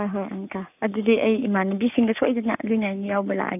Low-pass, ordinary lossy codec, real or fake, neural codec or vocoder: 3.6 kHz; none; fake; codec, 44.1 kHz, 7.8 kbps, Pupu-Codec